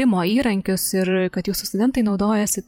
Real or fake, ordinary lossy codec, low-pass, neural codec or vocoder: fake; MP3, 96 kbps; 19.8 kHz; vocoder, 44.1 kHz, 128 mel bands every 512 samples, BigVGAN v2